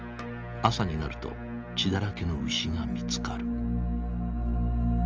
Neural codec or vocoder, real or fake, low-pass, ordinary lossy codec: none; real; 7.2 kHz; Opus, 24 kbps